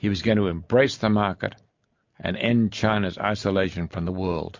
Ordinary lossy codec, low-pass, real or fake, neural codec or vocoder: MP3, 48 kbps; 7.2 kHz; real; none